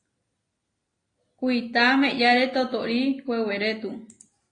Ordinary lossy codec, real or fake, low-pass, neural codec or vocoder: AAC, 32 kbps; real; 9.9 kHz; none